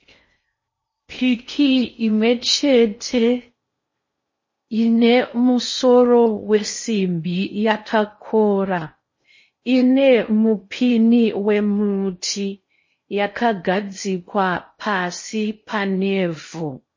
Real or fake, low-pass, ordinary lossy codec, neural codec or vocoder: fake; 7.2 kHz; MP3, 32 kbps; codec, 16 kHz in and 24 kHz out, 0.6 kbps, FocalCodec, streaming, 4096 codes